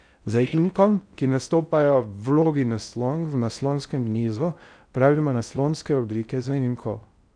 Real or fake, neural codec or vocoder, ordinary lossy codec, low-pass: fake; codec, 16 kHz in and 24 kHz out, 0.6 kbps, FocalCodec, streaming, 4096 codes; none; 9.9 kHz